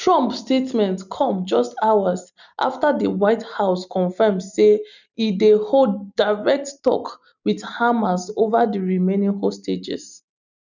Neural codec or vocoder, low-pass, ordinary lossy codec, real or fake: none; 7.2 kHz; none; real